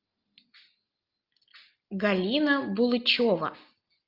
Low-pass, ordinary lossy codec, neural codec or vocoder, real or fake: 5.4 kHz; Opus, 24 kbps; none; real